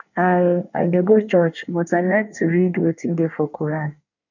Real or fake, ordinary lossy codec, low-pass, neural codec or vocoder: fake; none; 7.2 kHz; codec, 24 kHz, 1 kbps, SNAC